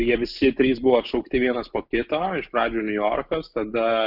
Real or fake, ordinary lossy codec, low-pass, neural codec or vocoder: real; Opus, 64 kbps; 5.4 kHz; none